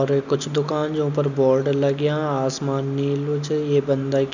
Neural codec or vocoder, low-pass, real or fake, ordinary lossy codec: none; 7.2 kHz; real; none